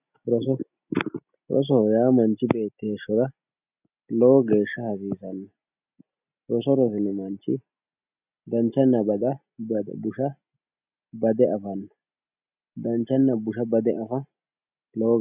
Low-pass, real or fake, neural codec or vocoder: 3.6 kHz; real; none